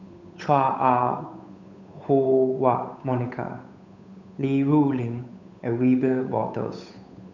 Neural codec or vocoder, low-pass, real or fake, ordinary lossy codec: codec, 16 kHz, 8 kbps, FunCodec, trained on Chinese and English, 25 frames a second; 7.2 kHz; fake; none